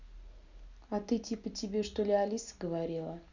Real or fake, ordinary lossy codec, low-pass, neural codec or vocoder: real; Opus, 64 kbps; 7.2 kHz; none